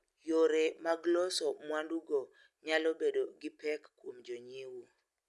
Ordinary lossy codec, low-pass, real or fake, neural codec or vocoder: none; none; real; none